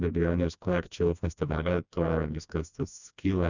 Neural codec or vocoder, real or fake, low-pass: codec, 16 kHz, 1 kbps, FreqCodec, smaller model; fake; 7.2 kHz